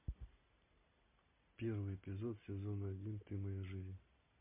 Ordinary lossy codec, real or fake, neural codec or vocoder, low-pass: MP3, 32 kbps; real; none; 3.6 kHz